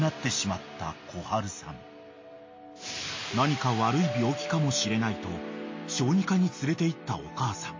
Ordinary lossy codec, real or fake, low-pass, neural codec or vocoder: MP3, 32 kbps; real; 7.2 kHz; none